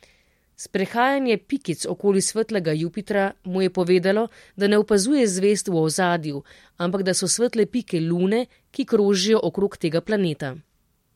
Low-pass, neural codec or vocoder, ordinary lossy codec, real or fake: 19.8 kHz; none; MP3, 64 kbps; real